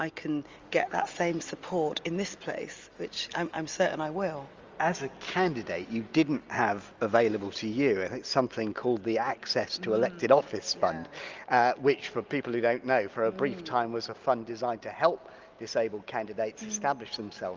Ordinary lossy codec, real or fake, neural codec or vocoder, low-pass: Opus, 32 kbps; real; none; 7.2 kHz